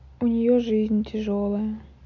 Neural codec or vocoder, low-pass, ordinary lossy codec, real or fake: none; 7.2 kHz; AAC, 48 kbps; real